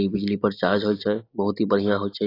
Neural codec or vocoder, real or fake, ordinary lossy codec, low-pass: none; real; AAC, 24 kbps; 5.4 kHz